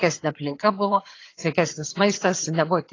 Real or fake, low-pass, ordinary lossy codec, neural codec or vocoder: fake; 7.2 kHz; AAC, 32 kbps; vocoder, 22.05 kHz, 80 mel bands, HiFi-GAN